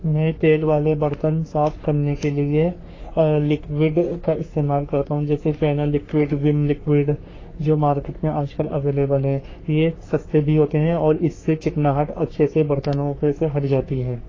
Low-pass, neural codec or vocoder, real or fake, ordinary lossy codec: 7.2 kHz; codec, 44.1 kHz, 3.4 kbps, Pupu-Codec; fake; AAC, 32 kbps